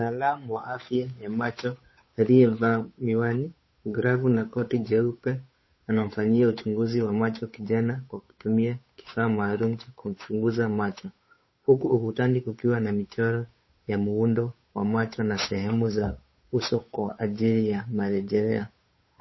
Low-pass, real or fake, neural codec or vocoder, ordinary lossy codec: 7.2 kHz; fake; codec, 16 kHz, 8 kbps, FunCodec, trained on Chinese and English, 25 frames a second; MP3, 24 kbps